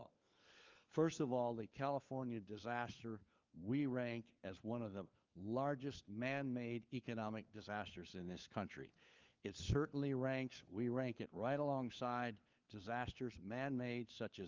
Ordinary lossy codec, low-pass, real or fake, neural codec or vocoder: Opus, 32 kbps; 7.2 kHz; fake; codec, 16 kHz, 4 kbps, FunCodec, trained on LibriTTS, 50 frames a second